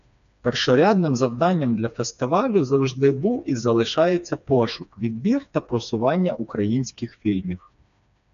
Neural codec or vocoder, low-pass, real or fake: codec, 16 kHz, 2 kbps, FreqCodec, smaller model; 7.2 kHz; fake